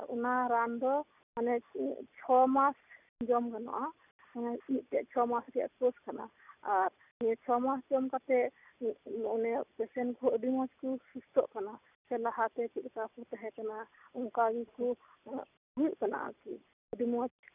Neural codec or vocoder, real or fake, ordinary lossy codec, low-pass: none; real; none; 3.6 kHz